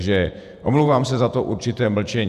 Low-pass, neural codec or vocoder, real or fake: 14.4 kHz; vocoder, 48 kHz, 128 mel bands, Vocos; fake